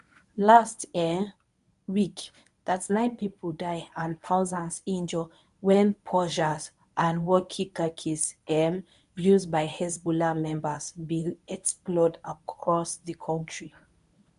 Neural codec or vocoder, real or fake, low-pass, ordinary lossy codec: codec, 24 kHz, 0.9 kbps, WavTokenizer, medium speech release version 1; fake; 10.8 kHz; none